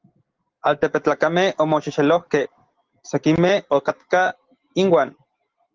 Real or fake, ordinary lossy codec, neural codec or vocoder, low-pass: real; Opus, 16 kbps; none; 7.2 kHz